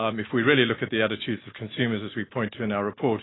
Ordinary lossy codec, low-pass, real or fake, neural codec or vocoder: AAC, 16 kbps; 7.2 kHz; real; none